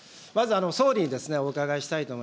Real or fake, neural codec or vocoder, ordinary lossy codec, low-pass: real; none; none; none